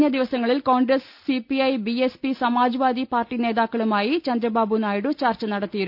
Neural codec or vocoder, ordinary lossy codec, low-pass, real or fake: none; none; 5.4 kHz; real